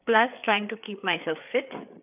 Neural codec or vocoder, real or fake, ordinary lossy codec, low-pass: codec, 16 kHz, 4 kbps, FreqCodec, larger model; fake; none; 3.6 kHz